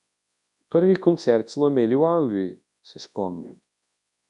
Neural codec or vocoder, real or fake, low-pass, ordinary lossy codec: codec, 24 kHz, 0.9 kbps, WavTokenizer, large speech release; fake; 10.8 kHz; none